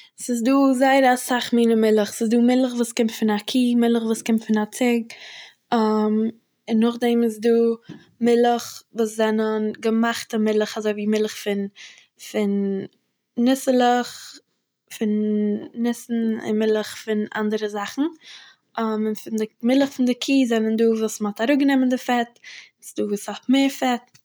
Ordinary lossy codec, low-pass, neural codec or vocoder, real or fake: none; none; none; real